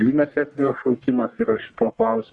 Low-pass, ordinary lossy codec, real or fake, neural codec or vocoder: 10.8 kHz; Opus, 64 kbps; fake; codec, 44.1 kHz, 1.7 kbps, Pupu-Codec